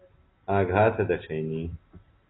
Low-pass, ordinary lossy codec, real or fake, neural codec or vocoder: 7.2 kHz; AAC, 16 kbps; real; none